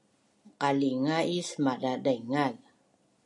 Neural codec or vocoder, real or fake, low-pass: none; real; 10.8 kHz